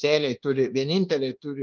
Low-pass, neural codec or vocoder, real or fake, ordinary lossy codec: 7.2 kHz; codec, 24 kHz, 1.2 kbps, DualCodec; fake; Opus, 32 kbps